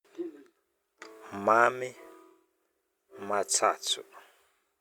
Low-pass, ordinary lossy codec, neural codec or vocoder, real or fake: 19.8 kHz; Opus, 64 kbps; none; real